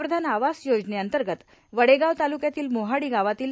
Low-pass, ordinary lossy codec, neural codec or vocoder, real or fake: none; none; none; real